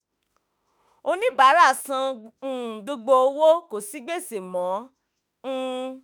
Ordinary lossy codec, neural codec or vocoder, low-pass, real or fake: none; autoencoder, 48 kHz, 32 numbers a frame, DAC-VAE, trained on Japanese speech; none; fake